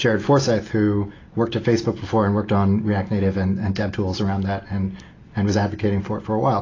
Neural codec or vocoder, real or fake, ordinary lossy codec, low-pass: none; real; AAC, 32 kbps; 7.2 kHz